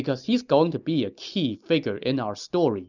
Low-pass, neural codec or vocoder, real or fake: 7.2 kHz; none; real